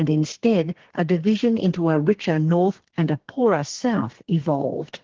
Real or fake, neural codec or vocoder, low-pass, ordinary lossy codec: fake; codec, 32 kHz, 1.9 kbps, SNAC; 7.2 kHz; Opus, 16 kbps